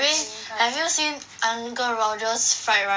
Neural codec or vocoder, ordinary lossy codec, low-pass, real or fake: none; none; none; real